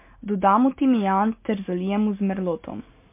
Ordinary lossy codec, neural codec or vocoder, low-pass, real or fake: MP3, 24 kbps; none; 3.6 kHz; real